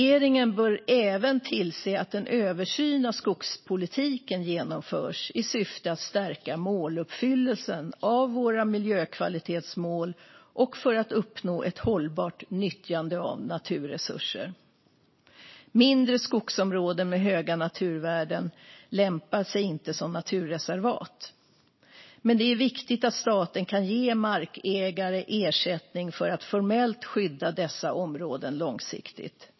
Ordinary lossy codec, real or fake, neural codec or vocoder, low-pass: MP3, 24 kbps; real; none; 7.2 kHz